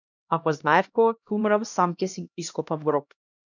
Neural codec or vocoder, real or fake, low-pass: codec, 16 kHz, 1 kbps, X-Codec, HuBERT features, trained on LibriSpeech; fake; 7.2 kHz